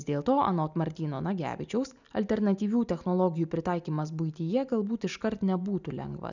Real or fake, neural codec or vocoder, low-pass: real; none; 7.2 kHz